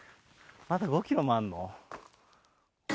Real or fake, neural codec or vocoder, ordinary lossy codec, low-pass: real; none; none; none